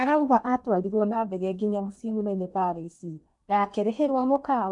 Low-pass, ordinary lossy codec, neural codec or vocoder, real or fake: 10.8 kHz; none; codec, 16 kHz in and 24 kHz out, 0.8 kbps, FocalCodec, streaming, 65536 codes; fake